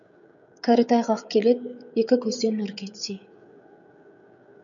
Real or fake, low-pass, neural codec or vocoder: fake; 7.2 kHz; codec, 16 kHz, 16 kbps, FreqCodec, smaller model